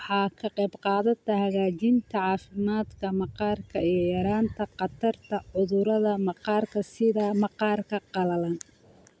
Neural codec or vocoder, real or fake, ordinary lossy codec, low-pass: none; real; none; none